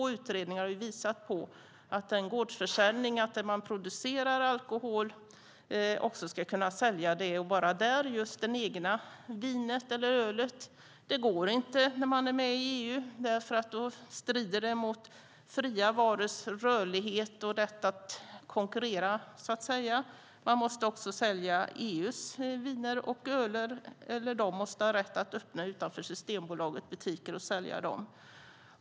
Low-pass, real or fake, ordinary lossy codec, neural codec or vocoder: none; real; none; none